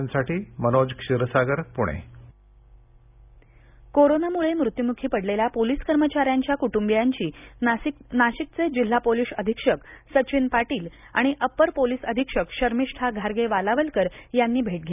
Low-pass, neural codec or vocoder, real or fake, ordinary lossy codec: 3.6 kHz; none; real; none